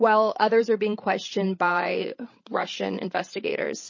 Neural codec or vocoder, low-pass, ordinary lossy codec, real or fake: codec, 16 kHz, 16 kbps, FreqCodec, larger model; 7.2 kHz; MP3, 32 kbps; fake